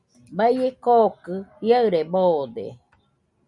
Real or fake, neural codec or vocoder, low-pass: real; none; 10.8 kHz